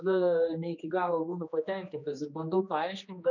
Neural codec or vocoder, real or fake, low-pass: codec, 16 kHz, 2 kbps, X-Codec, HuBERT features, trained on general audio; fake; 7.2 kHz